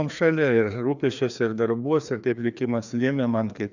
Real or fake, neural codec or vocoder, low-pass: fake; codec, 16 kHz, 2 kbps, FreqCodec, larger model; 7.2 kHz